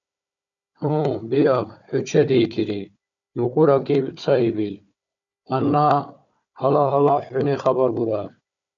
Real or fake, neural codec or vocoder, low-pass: fake; codec, 16 kHz, 4 kbps, FunCodec, trained on Chinese and English, 50 frames a second; 7.2 kHz